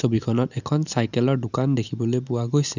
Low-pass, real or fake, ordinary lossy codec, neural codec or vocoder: 7.2 kHz; real; none; none